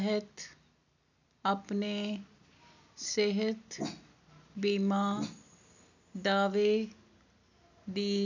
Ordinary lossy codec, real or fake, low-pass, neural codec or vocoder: none; real; 7.2 kHz; none